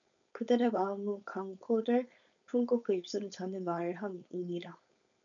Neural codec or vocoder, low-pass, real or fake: codec, 16 kHz, 4.8 kbps, FACodec; 7.2 kHz; fake